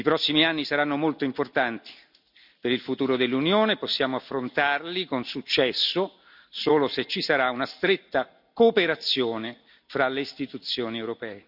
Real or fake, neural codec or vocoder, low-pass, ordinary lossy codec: real; none; 5.4 kHz; none